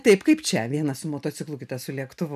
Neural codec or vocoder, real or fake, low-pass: none; real; 14.4 kHz